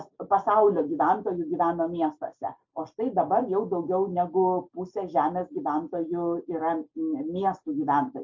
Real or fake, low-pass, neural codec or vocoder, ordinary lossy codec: real; 7.2 kHz; none; MP3, 48 kbps